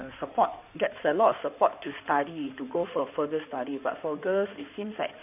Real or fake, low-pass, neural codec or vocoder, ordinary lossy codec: fake; 3.6 kHz; codec, 16 kHz in and 24 kHz out, 2.2 kbps, FireRedTTS-2 codec; none